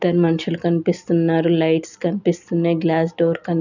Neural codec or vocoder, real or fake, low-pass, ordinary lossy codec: none; real; 7.2 kHz; none